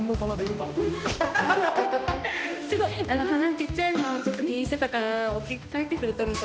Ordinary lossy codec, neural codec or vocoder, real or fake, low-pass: none; codec, 16 kHz, 1 kbps, X-Codec, HuBERT features, trained on balanced general audio; fake; none